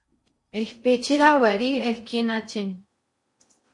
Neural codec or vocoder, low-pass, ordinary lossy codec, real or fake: codec, 16 kHz in and 24 kHz out, 0.6 kbps, FocalCodec, streaming, 4096 codes; 10.8 kHz; MP3, 48 kbps; fake